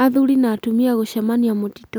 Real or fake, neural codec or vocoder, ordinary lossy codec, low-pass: real; none; none; none